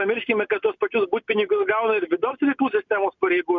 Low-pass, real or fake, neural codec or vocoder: 7.2 kHz; real; none